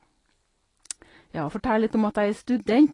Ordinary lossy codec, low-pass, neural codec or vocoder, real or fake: AAC, 32 kbps; 10.8 kHz; none; real